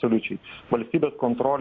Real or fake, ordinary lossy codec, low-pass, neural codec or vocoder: real; AAC, 32 kbps; 7.2 kHz; none